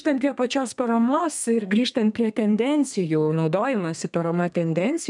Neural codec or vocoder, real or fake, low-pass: codec, 32 kHz, 1.9 kbps, SNAC; fake; 10.8 kHz